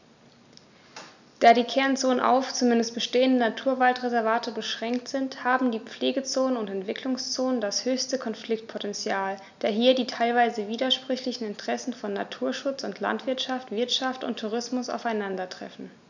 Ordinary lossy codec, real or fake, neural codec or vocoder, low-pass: none; real; none; 7.2 kHz